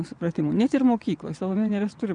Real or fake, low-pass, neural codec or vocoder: fake; 9.9 kHz; vocoder, 22.05 kHz, 80 mel bands, WaveNeXt